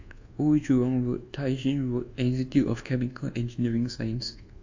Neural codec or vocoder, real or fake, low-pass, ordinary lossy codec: codec, 24 kHz, 1.2 kbps, DualCodec; fake; 7.2 kHz; AAC, 48 kbps